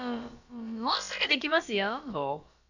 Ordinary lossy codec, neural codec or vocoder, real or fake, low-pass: none; codec, 16 kHz, about 1 kbps, DyCAST, with the encoder's durations; fake; 7.2 kHz